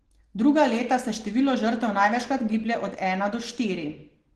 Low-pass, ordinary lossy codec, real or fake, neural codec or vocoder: 10.8 kHz; Opus, 16 kbps; real; none